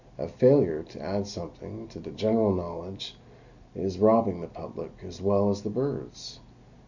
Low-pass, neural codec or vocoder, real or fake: 7.2 kHz; none; real